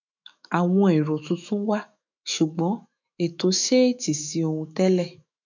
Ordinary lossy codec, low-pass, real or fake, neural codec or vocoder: none; 7.2 kHz; fake; autoencoder, 48 kHz, 128 numbers a frame, DAC-VAE, trained on Japanese speech